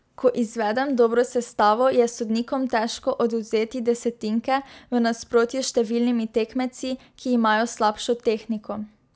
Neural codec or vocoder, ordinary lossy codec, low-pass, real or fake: none; none; none; real